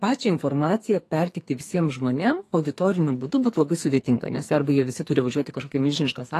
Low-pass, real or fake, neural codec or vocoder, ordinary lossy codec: 14.4 kHz; fake; codec, 44.1 kHz, 2.6 kbps, SNAC; AAC, 48 kbps